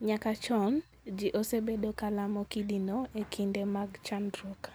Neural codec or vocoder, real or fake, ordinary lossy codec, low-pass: none; real; none; none